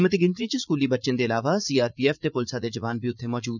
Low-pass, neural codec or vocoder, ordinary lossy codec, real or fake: 7.2 kHz; vocoder, 44.1 kHz, 80 mel bands, Vocos; none; fake